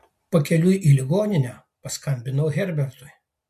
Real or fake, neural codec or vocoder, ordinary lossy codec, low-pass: real; none; MP3, 64 kbps; 14.4 kHz